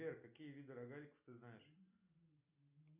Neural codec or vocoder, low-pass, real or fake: none; 3.6 kHz; real